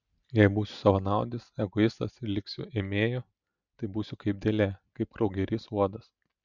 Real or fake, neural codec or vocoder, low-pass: real; none; 7.2 kHz